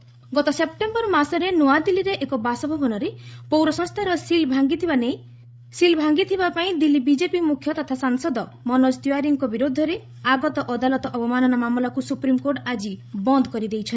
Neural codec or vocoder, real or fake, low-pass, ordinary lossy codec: codec, 16 kHz, 16 kbps, FreqCodec, larger model; fake; none; none